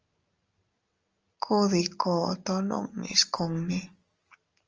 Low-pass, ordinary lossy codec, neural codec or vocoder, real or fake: 7.2 kHz; Opus, 24 kbps; none; real